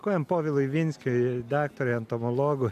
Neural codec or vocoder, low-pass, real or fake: none; 14.4 kHz; real